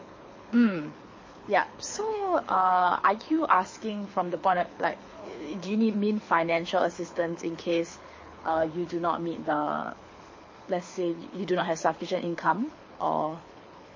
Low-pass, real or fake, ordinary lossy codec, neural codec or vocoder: 7.2 kHz; fake; MP3, 32 kbps; codec, 24 kHz, 6 kbps, HILCodec